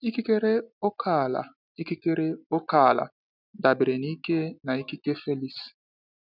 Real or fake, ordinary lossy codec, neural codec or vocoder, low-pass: real; none; none; 5.4 kHz